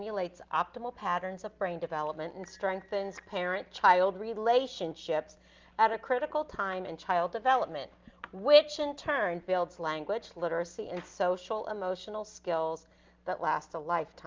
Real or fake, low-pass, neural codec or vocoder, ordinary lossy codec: real; 7.2 kHz; none; Opus, 32 kbps